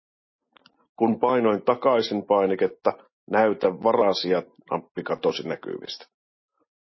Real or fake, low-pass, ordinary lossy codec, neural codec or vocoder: real; 7.2 kHz; MP3, 24 kbps; none